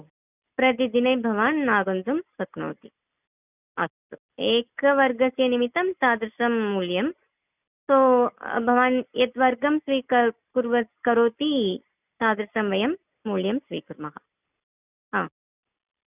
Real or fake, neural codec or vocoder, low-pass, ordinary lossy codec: real; none; 3.6 kHz; none